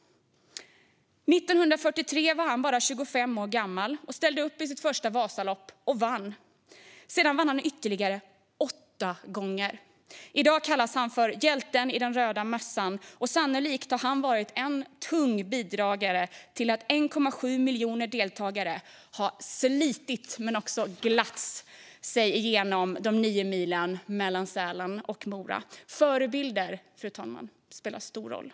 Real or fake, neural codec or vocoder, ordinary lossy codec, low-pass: real; none; none; none